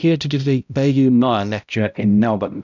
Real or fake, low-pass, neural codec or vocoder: fake; 7.2 kHz; codec, 16 kHz, 0.5 kbps, X-Codec, HuBERT features, trained on balanced general audio